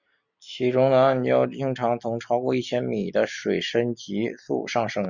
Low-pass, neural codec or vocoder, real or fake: 7.2 kHz; none; real